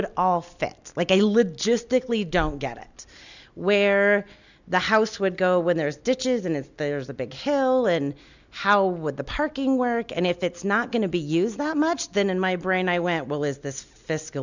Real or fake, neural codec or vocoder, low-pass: real; none; 7.2 kHz